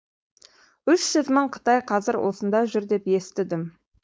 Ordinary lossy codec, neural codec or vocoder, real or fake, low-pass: none; codec, 16 kHz, 4.8 kbps, FACodec; fake; none